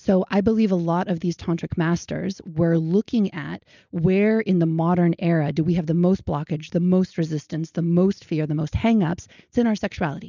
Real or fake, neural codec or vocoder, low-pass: real; none; 7.2 kHz